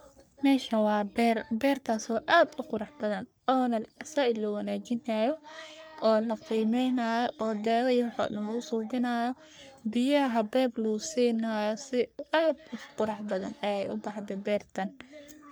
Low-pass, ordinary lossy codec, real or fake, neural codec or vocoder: none; none; fake; codec, 44.1 kHz, 3.4 kbps, Pupu-Codec